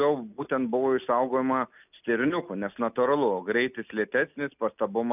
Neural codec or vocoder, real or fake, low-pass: none; real; 3.6 kHz